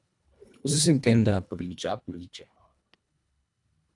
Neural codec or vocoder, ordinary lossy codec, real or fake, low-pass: codec, 24 kHz, 1.5 kbps, HILCodec; none; fake; 10.8 kHz